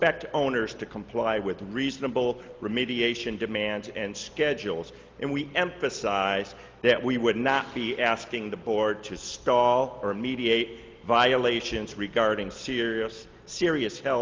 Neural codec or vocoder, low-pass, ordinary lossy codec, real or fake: none; 7.2 kHz; Opus, 24 kbps; real